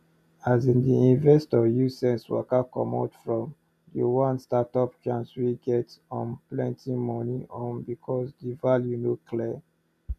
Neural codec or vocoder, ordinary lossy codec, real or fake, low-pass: none; none; real; 14.4 kHz